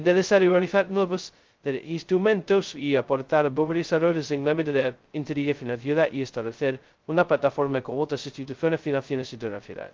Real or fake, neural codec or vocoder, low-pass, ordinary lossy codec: fake; codec, 16 kHz, 0.2 kbps, FocalCodec; 7.2 kHz; Opus, 32 kbps